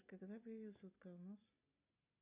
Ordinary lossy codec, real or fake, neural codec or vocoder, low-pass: AAC, 32 kbps; real; none; 3.6 kHz